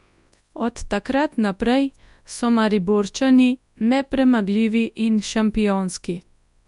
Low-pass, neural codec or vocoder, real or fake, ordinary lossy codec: 10.8 kHz; codec, 24 kHz, 0.9 kbps, WavTokenizer, large speech release; fake; none